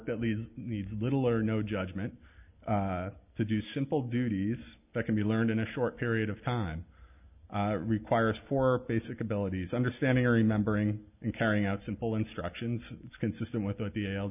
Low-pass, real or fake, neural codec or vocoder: 3.6 kHz; real; none